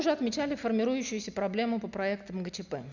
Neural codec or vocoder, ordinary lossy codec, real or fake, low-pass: none; none; real; 7.2 kHz